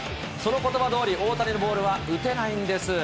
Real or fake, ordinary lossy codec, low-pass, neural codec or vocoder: real; none; none; none